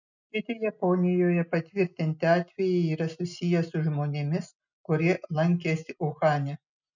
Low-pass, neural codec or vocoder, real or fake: 7.2 kHz; none; real